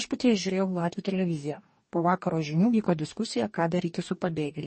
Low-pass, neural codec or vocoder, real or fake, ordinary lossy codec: 10.8 kHz; codec, 44.1 kHz, 2.6 kbps, DAC; fake; MP3, 32 kbps